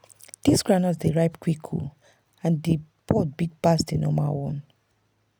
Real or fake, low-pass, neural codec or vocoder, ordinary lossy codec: real; none; none; none